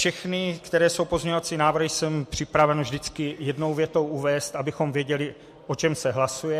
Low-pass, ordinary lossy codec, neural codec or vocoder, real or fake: 14.4 kHz; MP3, 64 kbps; none; real